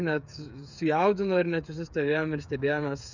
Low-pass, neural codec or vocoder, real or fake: 7.2 kHz; codec, 16 kHz, 8 kbps, FreqCodec, smaller model; fake